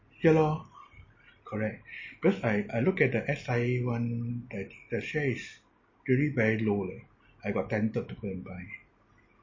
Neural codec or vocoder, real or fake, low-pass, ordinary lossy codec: none; real; 7.2 kHz; MP3, 32 kbps